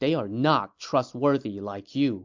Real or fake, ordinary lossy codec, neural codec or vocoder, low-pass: real; MP3, 64 kbps; none; 7.2 kHz